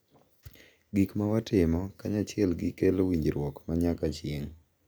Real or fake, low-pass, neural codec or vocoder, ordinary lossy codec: real; none; none; none